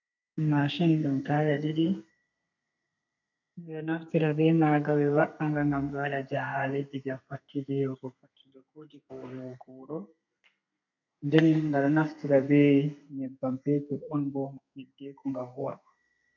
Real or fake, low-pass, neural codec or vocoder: fake; 7.2 kHz; codec, 32 kHz, 1.9 kbps, SNAC